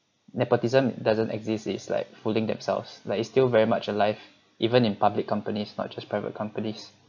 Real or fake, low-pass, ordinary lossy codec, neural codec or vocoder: real; 7.2 kHz; none; none